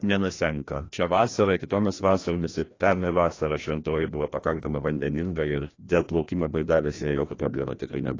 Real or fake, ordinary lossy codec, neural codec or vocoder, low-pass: fake; AAC, 32 kbps; codec, 16 kHz, 1 kbps, FreqCodec, larger model; 7.2 kHz